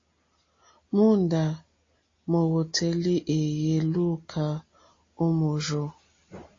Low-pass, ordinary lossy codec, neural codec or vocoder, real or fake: 7.2 kHz; AAC, 32 kbps; none; real